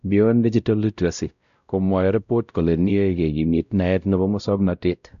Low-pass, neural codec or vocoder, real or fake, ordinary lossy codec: 7.2 kHz; codec, 16 kHz, 0.5 kbps, X-Codec, WavLM features, trained on Multilingual LibriSpeech; fake; none